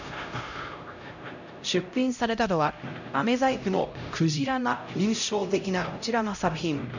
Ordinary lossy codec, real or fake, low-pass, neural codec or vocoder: none; fake; 7.2 kHz; codec, 16 kHz, 0.5 kbps, X-Codec, HuBERT features, trained on LibriSpeech